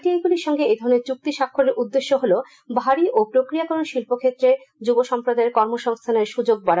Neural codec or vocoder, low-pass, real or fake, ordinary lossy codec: none; none; real; none